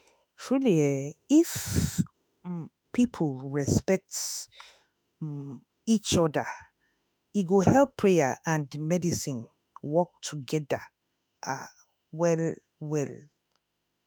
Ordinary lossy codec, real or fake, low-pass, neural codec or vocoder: none; fake; none; autoencoder, 48 kHz, 32 numbers a frame, DAC-VAE, trained on Japanese speech